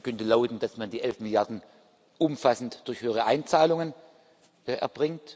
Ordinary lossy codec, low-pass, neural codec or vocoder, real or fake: none; none; none; real